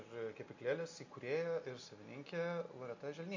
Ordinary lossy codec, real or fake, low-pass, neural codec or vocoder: MP3, 48 kbps; real; 7.2 kHz; none